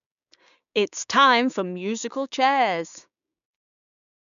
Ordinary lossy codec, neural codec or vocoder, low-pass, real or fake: none; codec, 16 kHz, 6 kbps, DAC; 7.2 kHz; fake